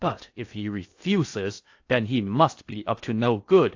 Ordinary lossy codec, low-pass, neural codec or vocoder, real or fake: AAC, 48 kbps; 7.2 kHz; codec, 16 kHz in and 24 kHz out, 0.8 kbps, FocalCodec, streaming, 65536 codes; fake